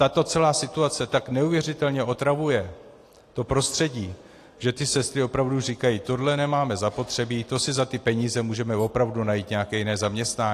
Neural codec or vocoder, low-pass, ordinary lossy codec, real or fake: none; 14.4 kHz; AAC, 48 kbps; real